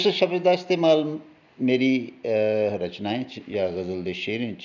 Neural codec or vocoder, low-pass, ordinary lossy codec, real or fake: none; 7.2 kHz; none; real